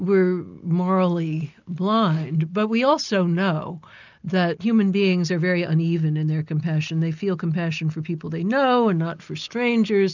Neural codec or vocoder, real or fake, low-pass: none; real; 7.2 kHz